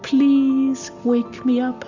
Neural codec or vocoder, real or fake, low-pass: none; real; 7.2 kHz